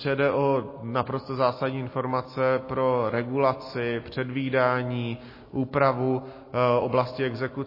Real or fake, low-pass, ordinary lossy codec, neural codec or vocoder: real; 5.4 kHz; MP3, 24 kbps; none